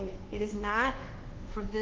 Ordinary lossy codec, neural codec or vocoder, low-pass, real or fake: Opus, 16 kbps; codec, 24 kHz, 1.2 kbps, DualCodec; 7.2 kHz; fake